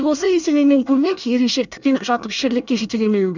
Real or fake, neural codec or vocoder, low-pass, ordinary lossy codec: fake; codec, 24 kHz, 1 kbps, SNAC; 7.2 kHz; none